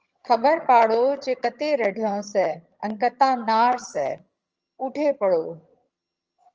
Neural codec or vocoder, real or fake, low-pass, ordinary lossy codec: vocoder, 22.05 kHz, 80 mel bands, HiFi-GAN; fake; 7.2 kHz; Opus, 24 kbps